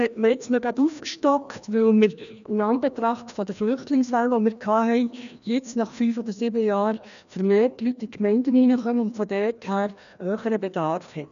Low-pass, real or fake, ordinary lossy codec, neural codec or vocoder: 7.2 kHz; fake; none; codec, 16 kHz, 1 kbps, FreqCodec, larger model